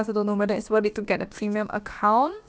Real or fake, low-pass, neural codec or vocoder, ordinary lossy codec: fake; none; codec, 16 kHz, about 1 kbps, DyCAST, with the encoder's durations; none